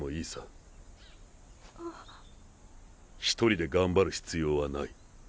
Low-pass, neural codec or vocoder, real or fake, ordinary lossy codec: none; none; real; none